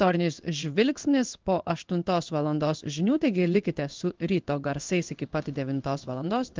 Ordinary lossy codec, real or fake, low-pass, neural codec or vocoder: Opus, 32 kbps; fake; 7.2 kHz; codec, 16 kHz in and 24 kHz out, 1 kbps, XY-Tokenizer